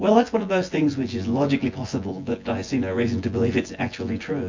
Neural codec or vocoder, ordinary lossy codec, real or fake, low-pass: vocoder, 24 kHz, 100 mel bands, Vocos; MP3, 48 kbps; fake; 7.2 kHz